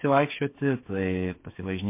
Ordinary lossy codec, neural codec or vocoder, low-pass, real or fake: MP3, 24 kbps; codec, 16 kHz, 8 kbps, FreqCodec, smaller model; 3.6 kHz; fake